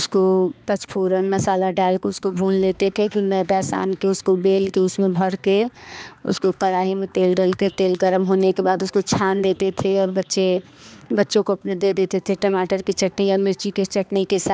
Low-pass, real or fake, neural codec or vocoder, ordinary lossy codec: none; fake; codec, 16 kHz, 2 kbps, X-Codec, HuBERT features, trained on balanced general audio; none